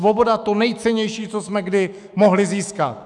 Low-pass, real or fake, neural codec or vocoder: 10.8 kHz; fake; autoencoder, 48 kHz, 128 numbers a frame, DAC-VAE, trained on Japanese speech